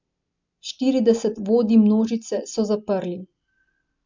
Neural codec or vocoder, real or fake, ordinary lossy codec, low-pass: none; real; MP3, 64 kbps; 7.2 kHz